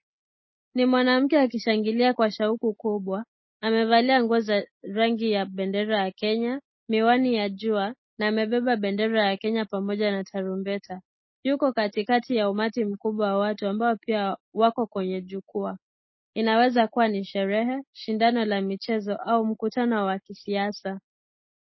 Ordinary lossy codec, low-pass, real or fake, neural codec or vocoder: MP3, 24 kbps; 7.2 kHz; real; none